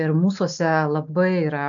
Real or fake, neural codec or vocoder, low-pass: real; none; 7.2 kHz